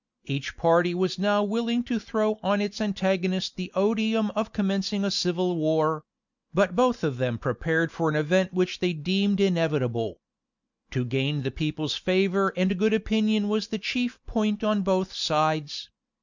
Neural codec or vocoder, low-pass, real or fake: none; 7.2 kHz; real